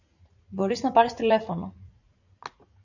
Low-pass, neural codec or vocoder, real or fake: 7.2 kHz; vocoder, 44.1 kHz, 80 mel bands, Vocos; fake